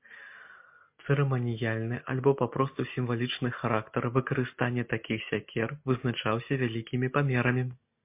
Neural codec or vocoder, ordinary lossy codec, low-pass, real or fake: none; MP3, 32 kbps; 3.6 kHz; real